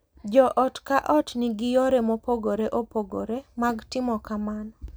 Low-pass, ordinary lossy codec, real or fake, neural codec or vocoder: none; none; real; none